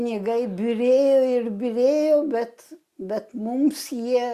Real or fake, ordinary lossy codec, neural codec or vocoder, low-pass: real; Opus, 64 kbps; none; 14.4 kHz